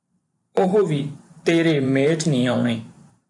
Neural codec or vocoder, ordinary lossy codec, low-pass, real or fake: vocoder, 44.1 kHz, 128 mel bands every 512 samples, BigVGAN v2; AAC, 64 kbps; 10.8 kHz; fake